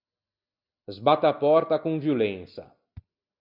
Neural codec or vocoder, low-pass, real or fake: none; 5.4 kHz; real